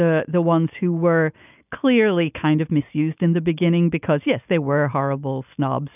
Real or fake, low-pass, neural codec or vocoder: real; 3.6 kHz; none